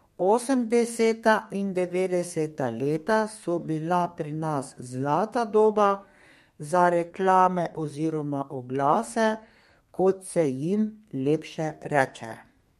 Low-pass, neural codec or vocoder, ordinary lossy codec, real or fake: 14.4 kHz; codec, 32 kHz, 1.9 kbps, SNAC; MP3, 64 kbps; fake